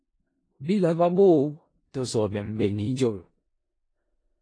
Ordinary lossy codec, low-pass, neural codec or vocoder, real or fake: AAC, 32 kbps; 9.9 kHz; codec, 16 kHz in and 24 kHz out, 0.4 kbps, LongCat-Audio-Codec, four codebook decoder; fake